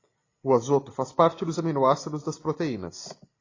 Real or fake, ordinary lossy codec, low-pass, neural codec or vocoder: real; AAC, 32 kbps; 7.2 kHz; none